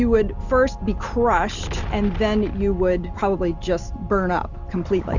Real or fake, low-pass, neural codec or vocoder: real; 7.2 kHz; none